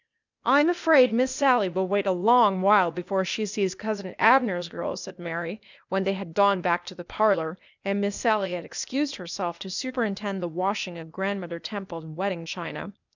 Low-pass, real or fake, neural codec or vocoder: 7.2 kHz; fake; codec, 16 kHz, 0.8 kbps, ZipCodec